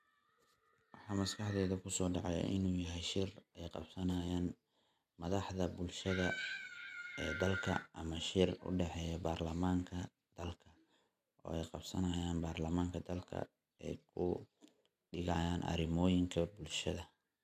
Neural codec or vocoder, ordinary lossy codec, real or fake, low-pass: none; none; real; 14.4 kHz